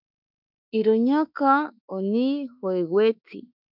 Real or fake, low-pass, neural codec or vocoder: fake; 5.4 kHz; autoencoder, 48 kHz, 32 numbers a frame, DAC-VAE, trained on Japanese speech